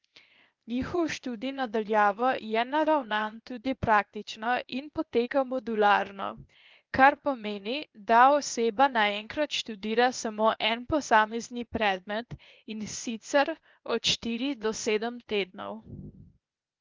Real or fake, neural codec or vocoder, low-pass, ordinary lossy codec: fake; codec, 16 kHz, 0.8 kbps, ZipCodec; 7.2 kHz; Opus, 24 kbps